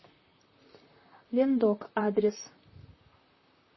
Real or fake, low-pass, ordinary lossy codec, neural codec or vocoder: fake; 7.2 kHz; MP3, 24 kbps; vocoder, 44.1 kHz, 128 mel bands, Pupu-Vocoder